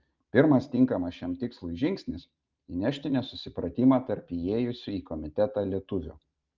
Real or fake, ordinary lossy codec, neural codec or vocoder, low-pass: real; Opus, 32 kbps; none; 7.2 kHz